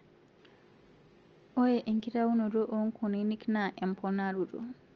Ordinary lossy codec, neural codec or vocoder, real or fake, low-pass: Opus, 24 kbps; none; real; 7.2 kHz